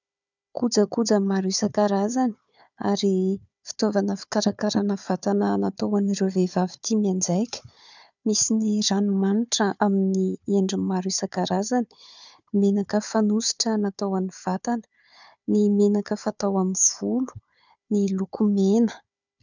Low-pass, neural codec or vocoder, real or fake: 7.2 kHz; codec, 16 kHz, 4 kbps, FunCodec, trained on Chinese and English, 50 frames a second; fake